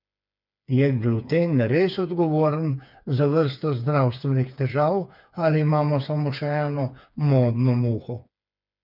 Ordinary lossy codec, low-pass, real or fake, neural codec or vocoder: none; 5.4 kHz; fake; codec, 16 kHz, 4 kbps, FreqCodec, smaller model